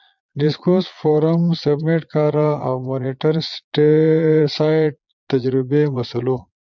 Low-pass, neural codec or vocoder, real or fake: 7.2 kHz; vocoder, 22.05 kHz, 80 mel bands, Vocos; fake